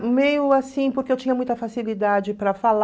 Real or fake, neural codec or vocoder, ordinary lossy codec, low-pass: real; none; none; none